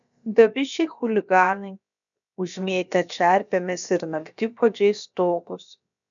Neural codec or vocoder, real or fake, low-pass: codec, 16 kHz, about 1 kbps, DyCAST, with the encoder's durations; fake; 7.2 kHz